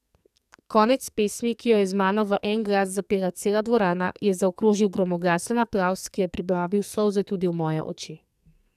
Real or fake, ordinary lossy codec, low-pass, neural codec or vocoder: fake; none; 14.4 kHz; codec, 44.1 kHz, 2.6 kbps, SNAC